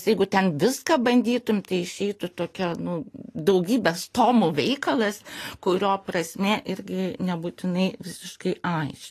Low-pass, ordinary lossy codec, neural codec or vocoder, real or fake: 14.4 kHz; AAC, 48 kbps; none; real